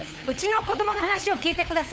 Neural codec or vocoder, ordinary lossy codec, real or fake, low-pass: codec, 16 kHz, 8 kbps, FunCodec, trained on LibriTTS, 25 frames a second; none; fake; none